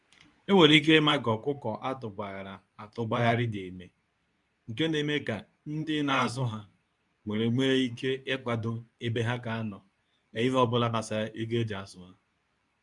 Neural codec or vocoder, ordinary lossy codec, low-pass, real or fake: codec, 24 kHz, 0.9 kbps, WavTokenizer, medium speech release version 2; none; 10.8 kHz; fake